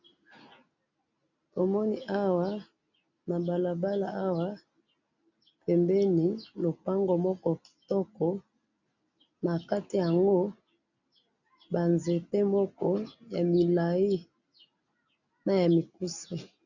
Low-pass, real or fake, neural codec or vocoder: 7.2 kHz; real; none